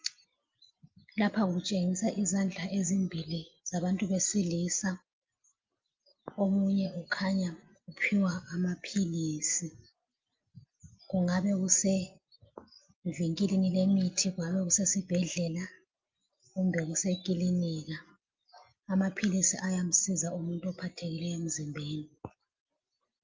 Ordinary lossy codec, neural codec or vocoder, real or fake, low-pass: Opus, 24 kbps; none; real; 7.2 kHz